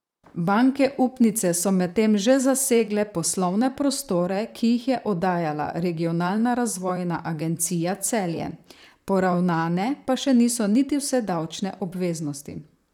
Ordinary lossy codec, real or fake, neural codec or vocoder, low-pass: none; fake; vocoder, 44.1 kHz, 128 mel bands, Pupu-Vocoder; 19.8 kHz